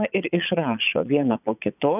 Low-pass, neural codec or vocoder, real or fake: 3.6 kHz; vocoder, 22.05 kHz, 80 mel bands, Vocos; fake